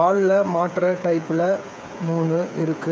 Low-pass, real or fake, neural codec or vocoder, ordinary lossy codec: none; fake; codec, 16 kHz, 8 kbps, FreqCodec, smaller model; none